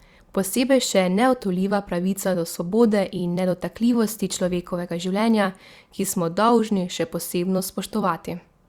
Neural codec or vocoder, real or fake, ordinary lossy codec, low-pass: vocoder, 44.1 kHz, 128 mel bands every 256 samples, BigVGAN v2; fake; Opus, 64 kbps; 19.8 kHz